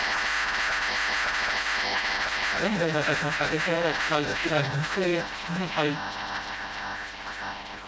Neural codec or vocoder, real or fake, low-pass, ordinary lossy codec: codec, 16 kHz, 0.5 kbps, FreqCodec, smaller model; fake; none; none